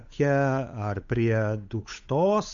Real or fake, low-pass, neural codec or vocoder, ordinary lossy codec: fake; 7.2 kHz; codec, 16 kHz, 8 kbps, FunCodec, trained on Chinese and English, 25 frames a second; AAC, 64 kbps